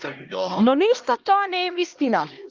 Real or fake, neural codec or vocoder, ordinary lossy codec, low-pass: fake; codec, 16 kHz, 1 kbps, X-Codec, HuBERT features, trained on LibriSpeech; Opus, 24 kbps; 7.2 kHz